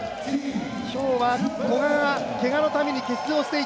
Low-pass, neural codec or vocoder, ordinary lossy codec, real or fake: none; none; none; real